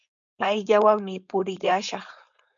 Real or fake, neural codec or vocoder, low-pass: fake; codec, 16 kHz, 4.8 kbps, FACodec; 7.2 kHz